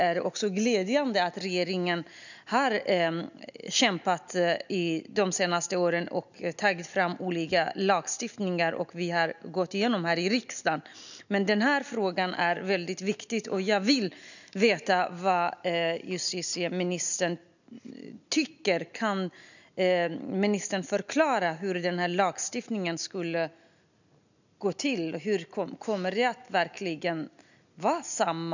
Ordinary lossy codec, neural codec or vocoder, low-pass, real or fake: none; none; 7.2 kHz; real